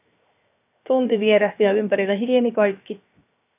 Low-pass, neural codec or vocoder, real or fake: 3.6 kHz; codec, 16 kHz, 0.7 kbps, FocalCodec; fake